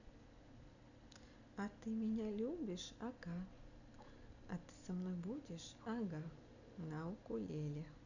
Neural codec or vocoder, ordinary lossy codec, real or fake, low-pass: none; none; real; 7.2 kHz